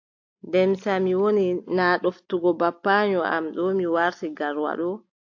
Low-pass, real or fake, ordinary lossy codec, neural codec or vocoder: 7.2 kHz; real; AAC, 48 kbps; none